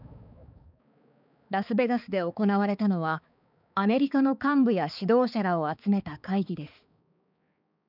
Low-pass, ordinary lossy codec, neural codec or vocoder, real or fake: 5.4 kHz; none; codec, 16 kHz, 4 kbps, X-Codec, HuBERT features, trained on balanced general audio; fake